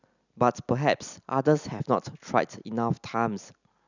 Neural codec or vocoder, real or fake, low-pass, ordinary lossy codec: none; real; 7.2 kHz; none